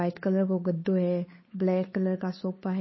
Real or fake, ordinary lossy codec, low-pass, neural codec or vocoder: fake; MP3, 24 kbps; 7.2 kHz; codec, 16 kHz, 8 kbps, FunCodec, trained on Chinese and English, 25 frames a second